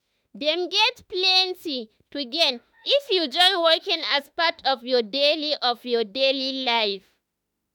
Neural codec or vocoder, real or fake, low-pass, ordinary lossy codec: autoencoder, 48 kHz, 32 numbers a frame, DAC-VAE, trained on Japanese speech; fake; none; none